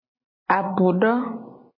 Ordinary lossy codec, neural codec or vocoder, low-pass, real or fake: MP3, 24 kbps; none; 5.4 kHz; real